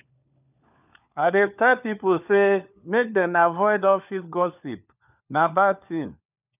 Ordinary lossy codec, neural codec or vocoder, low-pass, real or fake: none; codec, 16 kHz, 4 kbps, FunCodec, trained on LibriTTS, 50 frames a second; 3.6 kHz; fake